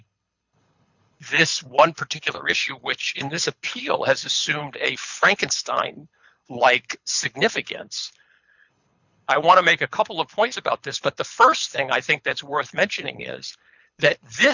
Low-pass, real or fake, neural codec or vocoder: 7.2 kHz; fake; codec, 24 kHz, 6 kbps, HILCodec